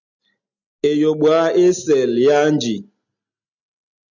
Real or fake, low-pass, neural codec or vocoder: real; 7.2 kHz; none